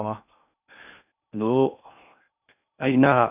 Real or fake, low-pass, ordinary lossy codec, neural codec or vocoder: fake; 3.6 kHz; none; codec, 16 kHz in and 24 kHz out, 0.6 kbps, FocalCodec, streaming, 4096 codes